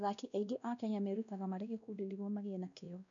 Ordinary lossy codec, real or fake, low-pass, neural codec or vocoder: none; fake; 7.2 kHz; codec, 16 kHz, 1 kbps, X-Codec, WavLM features, trained on Multilingual LibriSpeech